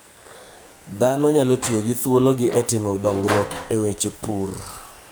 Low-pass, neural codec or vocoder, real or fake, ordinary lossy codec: none; codec, 44.1 kHz, 2.6 kbps, SNAC; fake; none